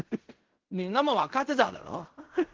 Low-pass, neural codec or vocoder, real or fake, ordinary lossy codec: 7.2 kHz; codec, 16 kHz in and 24 kHz out, 0.4 kbps, LongCat-Audio-Codec, fine tuned four codebook decoder; fake; Opus, 16 kbps